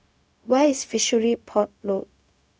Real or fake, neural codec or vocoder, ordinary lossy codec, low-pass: fake; codec, 16 kHz, 0.4 kbps, LongCat-Audio-Codec; none; none